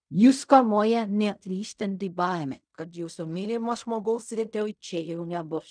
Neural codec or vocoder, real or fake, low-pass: codec, 16 kHz in and 24 kHz out, 0.4 kbps, LongCat-Audio-Codec, fine tuned four codebook decoder; fake; 9.9 kHz